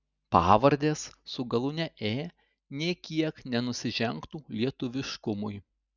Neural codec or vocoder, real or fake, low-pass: none; real; 7.2 kHz